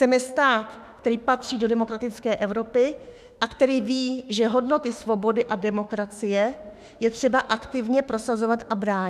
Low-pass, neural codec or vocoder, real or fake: 14.4 kHz; autoencoder, 48 kHz, 32 numbers a frame, DAC-VAE, trained on Japanese speech; fake